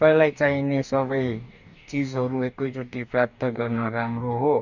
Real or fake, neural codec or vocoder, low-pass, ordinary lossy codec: fake; codec, 44.1 kHz, 2.6 kbps, DAC; 7.2 kHz; none